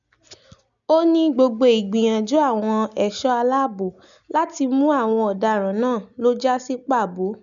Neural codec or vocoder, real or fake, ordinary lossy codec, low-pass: none; real; none; 7.2 kHz